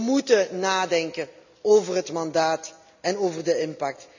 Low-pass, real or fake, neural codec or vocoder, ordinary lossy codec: 7.2 kHz; real; none; none